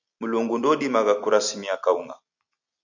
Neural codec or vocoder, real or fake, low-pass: none; real; 7.2 kHz